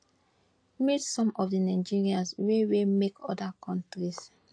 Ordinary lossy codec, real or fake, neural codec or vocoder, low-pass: AAC, 48 kbps; real; none; 9.9 kHz